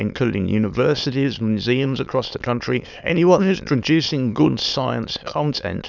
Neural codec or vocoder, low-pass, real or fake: autoencoder, 22.05 kHz, a latent of 192 numbers a frame, VITS, trained on many speakers; 7.2 kHz; fake